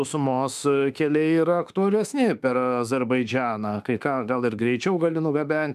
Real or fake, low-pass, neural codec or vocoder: fake; 14.4 kHz; autoencoder, 48 kHz, 32 numbers a frame, DAC-VAE, trained on Japanese speech